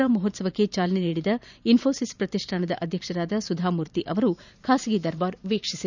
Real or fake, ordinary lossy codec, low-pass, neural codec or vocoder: fake; none; 7.2 kHz; vocoder, 44.1 kHz, 128 mel bands every 512 samples, BigVGAN v2